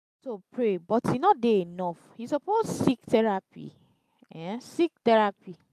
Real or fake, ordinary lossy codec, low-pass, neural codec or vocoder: real; AAC, 96 kbps; 14.4 kHz; none